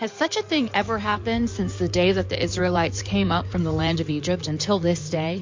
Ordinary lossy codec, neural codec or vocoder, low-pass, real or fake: MP3, 48 kbps; codec, 16 kHz in and 24 kHz out, 2.2 kbps, FireRedTTS-2 codec; 7.2 kHz; fake